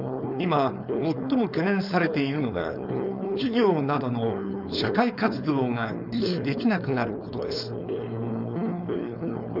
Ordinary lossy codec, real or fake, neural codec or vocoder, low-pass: none; fake; codec, 16 kHz, 4.8 kbps, FACodec; 5.4 kHz